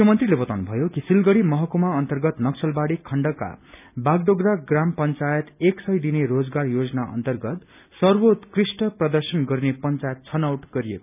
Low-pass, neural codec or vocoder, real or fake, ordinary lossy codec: 3.6 kHz; none; real; none